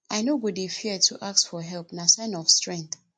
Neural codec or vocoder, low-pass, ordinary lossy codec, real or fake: none; 9.9 kHz; MP3, 48 kbps; real